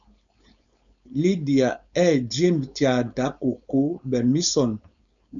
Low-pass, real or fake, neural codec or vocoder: 7.2 kHz; fake; codec, 16 kHz, 4.8 kbps, FACodec